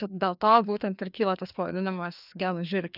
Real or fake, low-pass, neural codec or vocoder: fake; 5.4 kHz; codec, 16 kHz, 2 kbps, FreqCodec, larger model